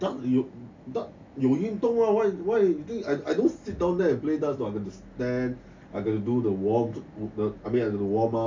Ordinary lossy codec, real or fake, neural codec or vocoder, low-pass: MP3, 64 kbps; real; none; 7.2 kHz